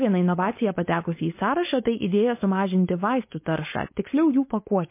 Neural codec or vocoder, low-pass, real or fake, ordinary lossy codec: codec, 16 kHz, 4.8 kbps, FACodec; 3.6 kHz; fake; MP3, 24 kbps